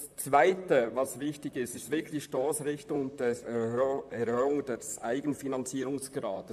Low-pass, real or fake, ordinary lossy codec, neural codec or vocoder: 14.4 kHz; fake; AAC, 96 kbps; vocoder, 44.1 kHz, 128 mel bands, Pupu-Vocoder